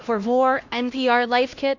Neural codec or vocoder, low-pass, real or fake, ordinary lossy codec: codec, 16 kHz, 1 kbps, X-Codec, HuBERT features, trained on LibriSpeech; 7.2 kHz; fake; MP3, 48 kbps